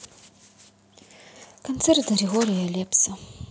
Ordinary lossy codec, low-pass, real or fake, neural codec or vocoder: none; none; real; none